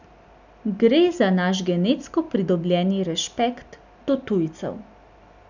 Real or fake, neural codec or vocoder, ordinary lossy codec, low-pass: real; none; none; 7.2 kHz